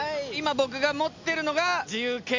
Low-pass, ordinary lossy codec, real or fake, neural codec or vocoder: 7.2 kHz; MP3, 64 kbps; real; none